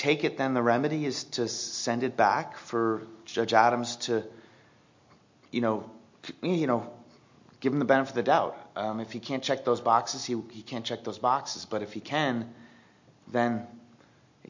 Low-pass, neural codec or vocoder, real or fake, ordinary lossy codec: 7.2 kHz; none; real; MP3, 48 kbps